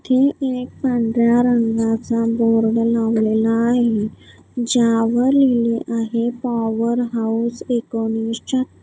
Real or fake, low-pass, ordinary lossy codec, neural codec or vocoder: real; none; none; none